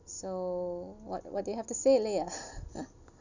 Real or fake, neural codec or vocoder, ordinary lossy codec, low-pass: real; none; none; 7.2 kHz